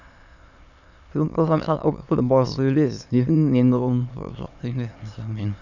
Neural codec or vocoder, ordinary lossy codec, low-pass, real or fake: autoencoder, 22.05 kHz, a latent of 192 numbers a frame, VITS, trained on many speakers; none; 7.2 kHz; fake